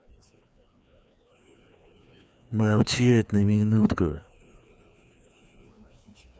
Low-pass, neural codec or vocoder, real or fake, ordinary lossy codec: none; codec, 16 kHz, 2 kbps, FreqCodec, larger model; fake; none